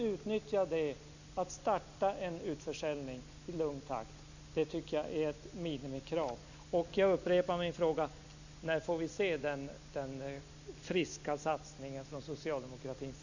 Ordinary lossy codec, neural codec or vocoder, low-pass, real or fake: none; none; 7.2 kHz; real